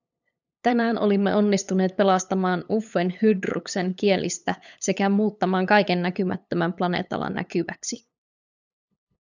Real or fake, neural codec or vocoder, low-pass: fake; codec, 16 kHz, 8 kbps, FunCodec, trained on LibriTTS, 25 frames a second; 7.2 kHz